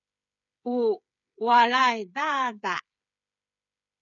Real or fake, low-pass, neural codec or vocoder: fake; 7.2 kHz; codec, 16 kHz, 8 kbps, FreqCodec, smaller model